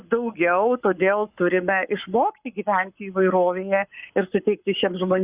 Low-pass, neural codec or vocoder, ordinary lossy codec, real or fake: 3.6 kHz; codec, 44.1 kHz, 7.8 kbps, Pupu-Codec; Opus, 64 kbps; fake